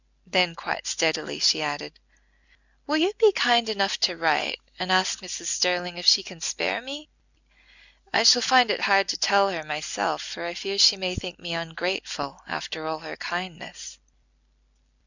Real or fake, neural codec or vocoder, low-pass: real; none; 7.2 kHz